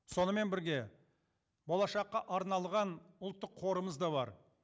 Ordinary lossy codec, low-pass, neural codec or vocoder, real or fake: none; none; none; real